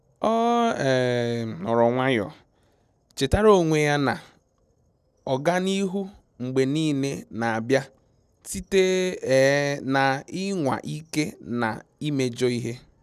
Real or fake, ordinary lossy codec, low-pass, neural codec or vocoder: real; none; 14.4 kHz; none